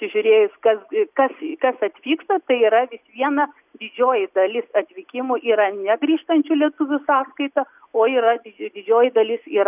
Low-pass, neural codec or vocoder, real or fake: 3.6 kHz; none; real